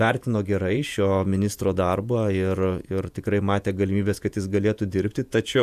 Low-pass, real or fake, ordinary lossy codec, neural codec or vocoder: 14.4 kHz; fake; AAC, 96 kbps; vocoder, 48 kHz, 128 mel bands, Vocos